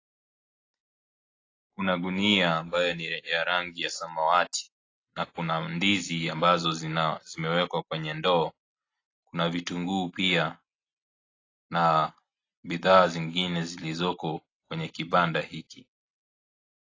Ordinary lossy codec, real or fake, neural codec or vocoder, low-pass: AAC, 32 kbps; real; none; 7.2 kHz